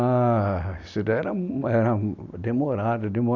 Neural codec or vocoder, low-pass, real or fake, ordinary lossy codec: none; 7.2 kHz; real; none